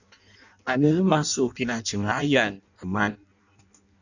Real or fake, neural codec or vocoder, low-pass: fake; codec, 16 kHz in and 24 kHz out, 0.6 kbps, FireRedTTS-2 codec; 7.2 kHz